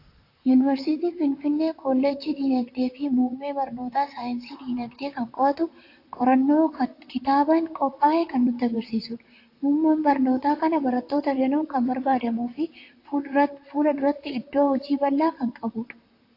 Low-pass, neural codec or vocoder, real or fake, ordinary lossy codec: 5.4 kHz; vocoder, 44.1 kHz, 128 mel bands, Pupu-Vocoder; fake; AAC, 32 kbps